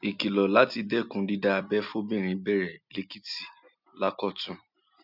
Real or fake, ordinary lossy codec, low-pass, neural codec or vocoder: real; none; 5.4 kHz; none